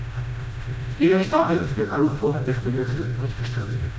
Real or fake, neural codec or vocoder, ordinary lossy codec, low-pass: fake; codec, 16 kHz, 0.5 kbps, FreqCodec, smaller model; none; none